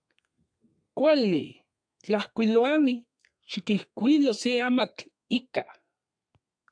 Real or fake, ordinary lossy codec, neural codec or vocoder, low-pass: fake; AAC, 64 kbps; codec, 32 kHz, 1.9 kbps, SNAC; 9.9 kHz